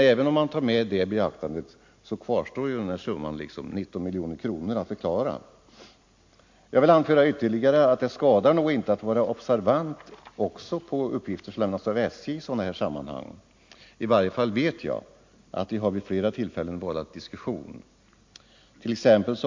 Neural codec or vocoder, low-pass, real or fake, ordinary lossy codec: none; 7.2 kHz; real; MP3, 48 kbps